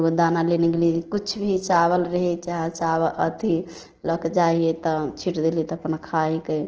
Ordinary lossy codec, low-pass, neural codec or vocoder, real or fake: Opus, 16 kbps; 7.2 kHz; none; real